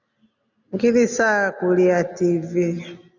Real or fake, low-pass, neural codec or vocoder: real; 7.2 kHz; none